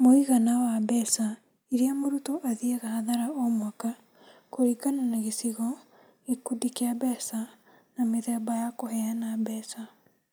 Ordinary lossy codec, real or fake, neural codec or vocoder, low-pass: none; real; none; none